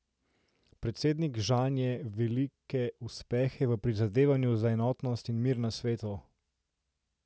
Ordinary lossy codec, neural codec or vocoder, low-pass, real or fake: none; none; none; real